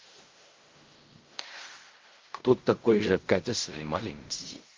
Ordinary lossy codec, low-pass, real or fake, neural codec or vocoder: Opus, 24 kbps; 7.2 kHz; fake; codec, 16 kHz in and 24 kHz out, 0.4 kbps, LongCat-Audio-Codec, fine tuned four codebook decoder